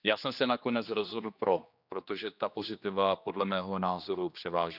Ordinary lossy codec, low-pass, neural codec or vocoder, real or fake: none; 5.4 kHz; codec, 16 kHz, 2 kbps, X-Codec, HuBERT features, trained on general audio; fake